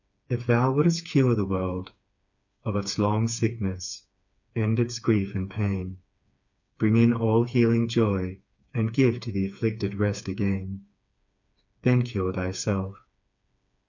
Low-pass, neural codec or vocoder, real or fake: 7.2 kHz; codec, 16 kHz, 4 kbps, FreqCodec, smaller model; fake